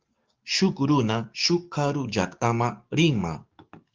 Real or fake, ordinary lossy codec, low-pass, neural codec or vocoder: fake; Opus, 32 kbps; 7.2 kHz; codec, 44.1 kHz, 7.8 kbps, DAC